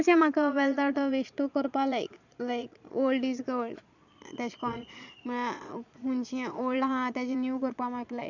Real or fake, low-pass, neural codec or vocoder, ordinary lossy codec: fake; 7.2 kHz; vocoder, 22.05 kHz, 80 mel bands, Vocos; none